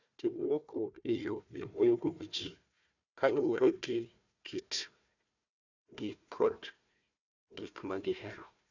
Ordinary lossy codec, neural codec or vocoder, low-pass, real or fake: none; codec, 16 kHz, 1 kbps, FunCodec, trained on Chinese and English, 50 frames a second; 7.2 kHz; fake